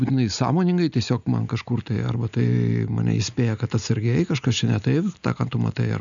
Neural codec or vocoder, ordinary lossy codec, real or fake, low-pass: none; MP3, 96 kbps; real; 7.2 kHz